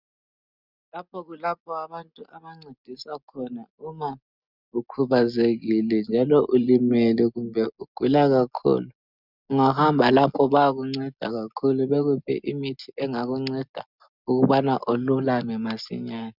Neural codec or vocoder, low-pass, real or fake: none; 5.4 kHz; real